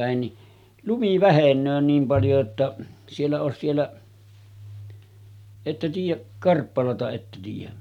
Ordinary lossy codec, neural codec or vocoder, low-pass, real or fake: none; none; 19.8 kHz; real